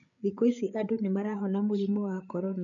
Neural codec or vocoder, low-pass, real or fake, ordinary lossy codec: codec, 16 kHz, 8 kbps, FreqCodec, larger model; 7.2 kHz; fake; none